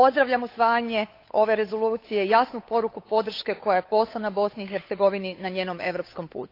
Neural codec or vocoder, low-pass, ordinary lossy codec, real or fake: codec, 16 kHz, 8 kbps, FunCodec, trained on Chinese and English, 25 frames a second; 5.4 kHz; AAC, 32 kbps; fake